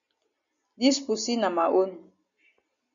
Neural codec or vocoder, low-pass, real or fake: none; 7.2 kHz; real